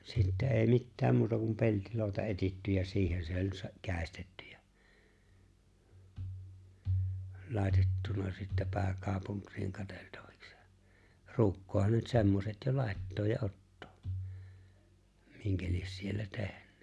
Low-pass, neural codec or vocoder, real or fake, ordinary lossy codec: none; none; real; none